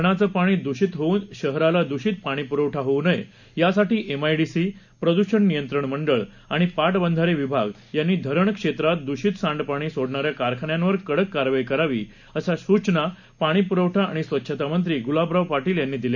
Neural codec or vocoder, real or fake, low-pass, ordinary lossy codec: none; real; 7.2 kHz; none